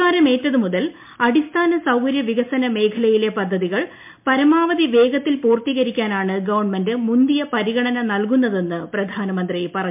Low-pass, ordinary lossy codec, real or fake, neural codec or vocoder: 3.6 kHz; MP3, 32 kbps; real; none